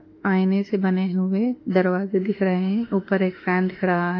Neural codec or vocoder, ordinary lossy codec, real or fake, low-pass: autoencoder, 48 kHz, 32 numbers a frame, DAC-VAE, trained on Japanese speech; AAC, 32 kbps; fake; 7.2 kHz